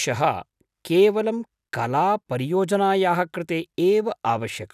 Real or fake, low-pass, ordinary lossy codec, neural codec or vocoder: real; 14.4 kHz; none; none